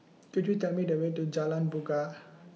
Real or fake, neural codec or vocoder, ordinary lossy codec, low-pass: real; none; none; none